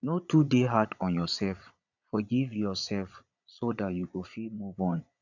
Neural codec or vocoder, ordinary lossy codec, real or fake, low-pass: vocoder, 22.05 kHz, 80 mel bands, Vocos; none; fake; 7.2 kHz